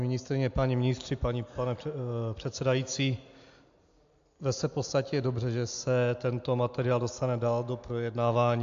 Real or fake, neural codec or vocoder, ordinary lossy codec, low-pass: real; none; AAC, 64 kbps; 7.2 kHz